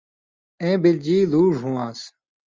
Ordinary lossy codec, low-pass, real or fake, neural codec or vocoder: Opus, 32 kbps; 7.2 kHz; real; none